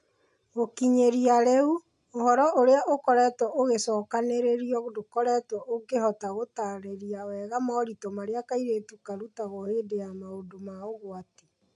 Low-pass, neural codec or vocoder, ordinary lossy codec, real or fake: 10.8 kHz; none; AAC, 64 kbps; real